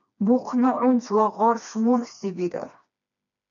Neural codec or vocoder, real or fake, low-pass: codec, 16 kHz, 2 kbps, FreqCodec, smaller model; fake; 7.2 kHz